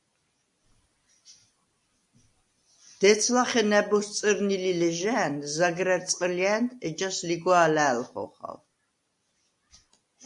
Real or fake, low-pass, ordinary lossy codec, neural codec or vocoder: real; 10.8 kHz; AAC, 64 kbps; none